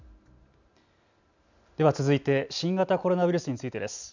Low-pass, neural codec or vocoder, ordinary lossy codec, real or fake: 7.2 kHz; none; none; real